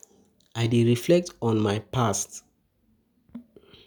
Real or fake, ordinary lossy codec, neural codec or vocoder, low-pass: real; none; none; none